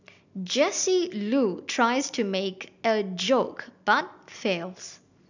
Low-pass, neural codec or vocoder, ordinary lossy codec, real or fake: 7.2 kHz; none; none; real